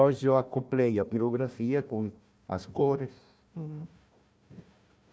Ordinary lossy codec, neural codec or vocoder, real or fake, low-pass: none; codec, 16 kHz, 1 kbps, FunCodec, trained on Chinese and English, 50 frames a second; fake; none